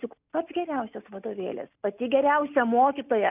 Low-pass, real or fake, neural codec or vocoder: 3.6 kHz; real; none